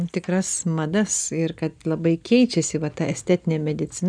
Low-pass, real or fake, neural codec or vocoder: 9.9 kHz; real; none